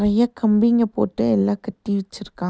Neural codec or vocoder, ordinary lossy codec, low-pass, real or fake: none; none; none; real